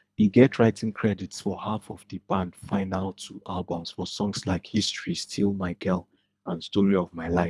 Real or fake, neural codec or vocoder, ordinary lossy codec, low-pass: fake; codec, 24 kHz, 3 kbps, HILCodec; none; none